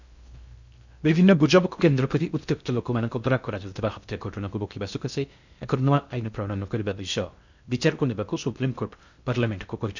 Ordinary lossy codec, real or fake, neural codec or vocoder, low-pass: none; fake; codec, 16 kHz in and 24 kHz out, 0.6 kbps, FocalCodec, streaming, 2048 codes; 7.2 kHz